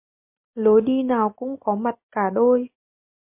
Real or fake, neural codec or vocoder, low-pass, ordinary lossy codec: real; none; 3.6 kHz; MP3, 24 kbps